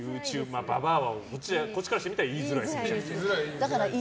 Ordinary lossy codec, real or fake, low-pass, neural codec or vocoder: none; real; none; none